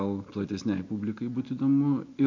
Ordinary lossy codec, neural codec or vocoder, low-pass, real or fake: MP3, 64 kbps; none; 7.2 kHz; real